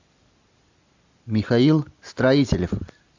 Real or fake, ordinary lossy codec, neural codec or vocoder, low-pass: real; AAC, 48 kbps; none; 7.2 kHz